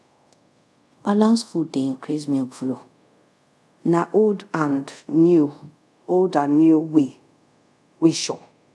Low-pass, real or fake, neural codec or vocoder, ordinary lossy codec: none; fake; codec, 24 kHz, 0.5 kbps, DualCodec; none